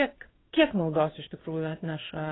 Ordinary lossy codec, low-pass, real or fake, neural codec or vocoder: AAC, 16 kbps; 7.2 kHz; fake; codec, 16 kHz in and 24 kHz out, 1 kbps, XY-Tokenizer